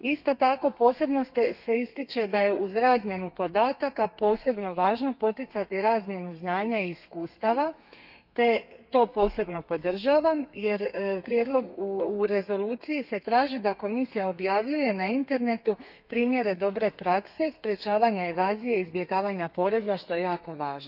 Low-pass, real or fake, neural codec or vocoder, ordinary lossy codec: 5.4 kHz; fake; codec, 32 kHz, 1.9 kbps, SNAC; none